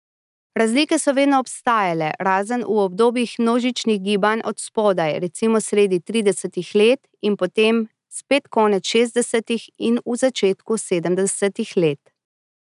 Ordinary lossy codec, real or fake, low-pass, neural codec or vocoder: none; real; 10.8 kHz; none